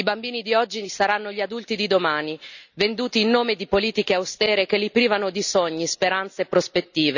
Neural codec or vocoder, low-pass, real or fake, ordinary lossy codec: none; 7.2 kHz; real; none